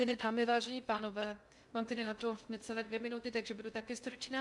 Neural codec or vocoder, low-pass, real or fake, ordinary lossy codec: codec, 16 kHz in and 24 kHz out, 0.6 kbps, FocalCodec, streaming, 2048 codes; 10.8 kHz; fake; MP3, 96 kbps